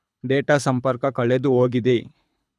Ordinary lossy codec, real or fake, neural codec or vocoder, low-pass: none; fake; codec, 24 kHz, 6 kbps, HILCodec; none